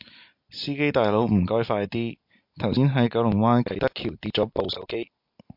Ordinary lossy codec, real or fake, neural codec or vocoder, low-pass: MP3, 48 kbps; real; none; 5.4 kHz